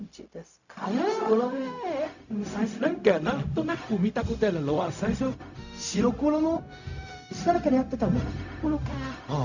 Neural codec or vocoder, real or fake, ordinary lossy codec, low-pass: codec, 16 kHz, 0.4 kbps, LongCat-Audio-Codec; fake; none; 7.2 kHz